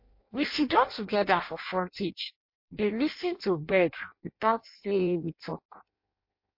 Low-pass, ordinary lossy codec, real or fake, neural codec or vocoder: 5.4 kHz; MP3, 32 kbps; fake; codec, 16 kHz in and 24 kHz out, 0.6 kbps, FireRedTTS-2 codec